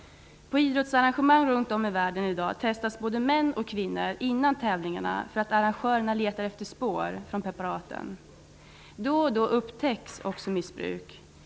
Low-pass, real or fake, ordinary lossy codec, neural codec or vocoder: none; real; none; none